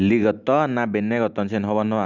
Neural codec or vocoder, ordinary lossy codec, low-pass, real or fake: none; none; 7.2 kHz; real